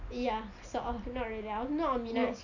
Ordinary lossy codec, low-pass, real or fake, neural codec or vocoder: none; 7.2 kHz; real; none